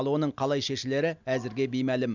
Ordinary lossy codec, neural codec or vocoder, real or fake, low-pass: none; none; real; 7.2 kHz